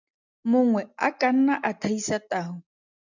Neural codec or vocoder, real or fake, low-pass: none; real; 7.2 kHz